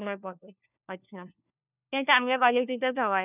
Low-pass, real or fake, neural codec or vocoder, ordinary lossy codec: 3.6 kHz; fake; codec, 16 kHz, 1 kbps, FunCodec, trained on LibriTTS, 50 frames a second; none